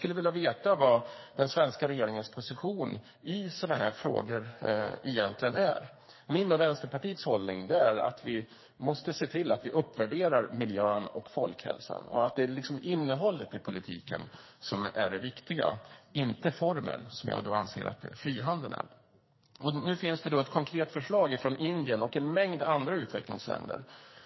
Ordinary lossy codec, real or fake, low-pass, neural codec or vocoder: MP3, 24 kbps; fake; 7.2 kHz; codec, 44.1 kHz, 2.6 kbps, SNAC